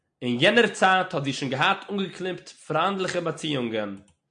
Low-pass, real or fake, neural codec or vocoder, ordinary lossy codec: 9.9 kHz; real; none; MP3, 64 kbps